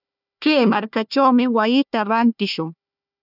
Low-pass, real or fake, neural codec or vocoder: 5.4 kHz; fake; codec, 16 kHz, 1 kbps, FunCodec, trained on Chinese and English, 50 frames a second